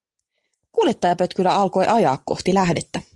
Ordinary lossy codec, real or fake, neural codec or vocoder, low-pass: Opus, 24 kbps; real; none; 10.8 kHz